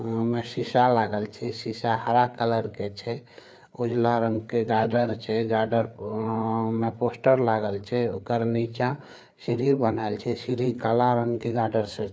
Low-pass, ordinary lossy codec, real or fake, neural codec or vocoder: none; none; fake; codec, 16 kHz, 4 kbps, FreqCodec, larger model